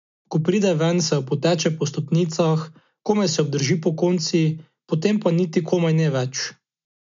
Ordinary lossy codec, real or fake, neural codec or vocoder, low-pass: MP3, 64 kbps; real; none; 7.2 kHz